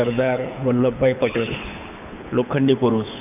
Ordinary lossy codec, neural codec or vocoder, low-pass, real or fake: none; codec, 24 kHz, 6 kbps, HILCodec; 3.6 kHz; fake